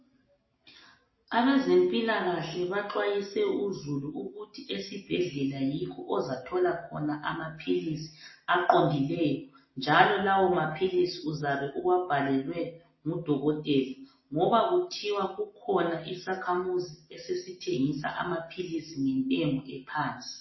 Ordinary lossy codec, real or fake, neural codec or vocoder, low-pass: MP3, 24 kbps; real; none; 7.2 kHz